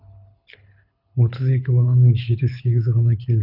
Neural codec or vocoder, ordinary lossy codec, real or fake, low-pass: codec, 24 kHz, 6 kbps, HILCodec; none; fake; 5.4 kHz